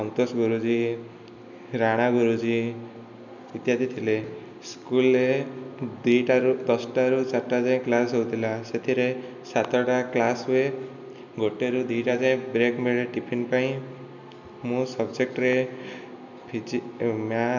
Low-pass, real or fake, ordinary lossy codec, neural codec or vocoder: 7.2 kHz; real; none; none